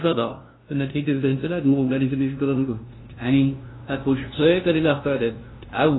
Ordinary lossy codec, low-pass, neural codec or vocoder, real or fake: AAC, 16 kbps; 7.2 kHz; codec, 16 kHz, 0.5 kbps, FunCodec, trained on LibriTTS, 25 frames a second; fake